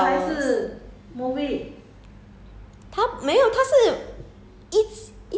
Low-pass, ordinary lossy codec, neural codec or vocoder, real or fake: none; none; none; real